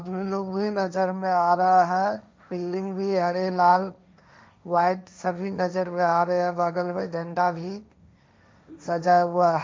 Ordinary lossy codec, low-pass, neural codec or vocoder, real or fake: none; none; codec, 16 kHz, 1.1 kbps, Voila-Tokenizer; fake